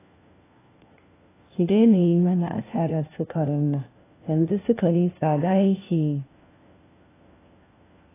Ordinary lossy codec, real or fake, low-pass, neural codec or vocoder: AAC, 16 kbps; fake; 3.6 kHz; codec, 16 kHz, 1 kbps, FunCodec, trained on LibriTTS, 50 frames a second